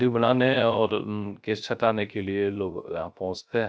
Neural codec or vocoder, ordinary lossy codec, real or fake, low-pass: codec, 16 kHz, 0.3 kbps, FocalCodec; none; fake; none